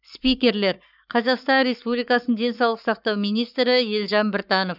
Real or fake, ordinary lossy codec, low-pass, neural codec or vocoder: fake; none; 5.4 kHz; codec, 44.1 kHz, 7.8 kbps, Pupu-Codec